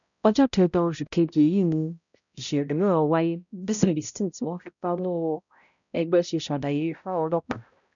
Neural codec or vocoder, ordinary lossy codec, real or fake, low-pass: codec, 16 kHz, 0.5 kbps, X-Codec, HuBERT features, trained on balanced general audio; none; fake; 7.2 kHz